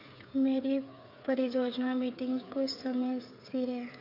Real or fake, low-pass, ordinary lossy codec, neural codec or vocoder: fake; 5.4 kHz; none; codec, 16 kHz, 8 kbps, FreqCodec, smaller model